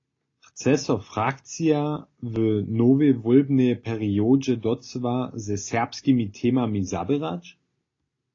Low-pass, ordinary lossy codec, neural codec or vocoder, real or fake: 7.2 kHz; AAC, 32 kbps; none; real